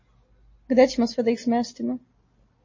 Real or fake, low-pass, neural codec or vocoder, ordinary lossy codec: real; 7.2 kHz; none; MP3, 32 kbps